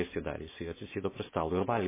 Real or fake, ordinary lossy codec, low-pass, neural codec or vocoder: real; MP3, 16 kbps; 3.6 kHz; none